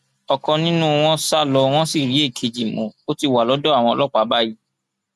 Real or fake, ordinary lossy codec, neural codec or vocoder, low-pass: real; none; none; 14.4 kHz